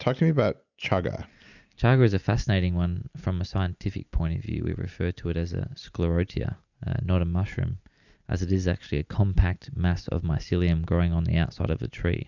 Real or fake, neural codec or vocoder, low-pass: real; none; 7.2 kHz